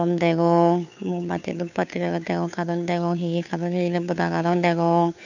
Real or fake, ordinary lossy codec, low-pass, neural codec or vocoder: fake; none; 7.2 kHz; codec, 16 kHz, 2 kbps, FunCodec, trained on Chinese and English, 25 frames a second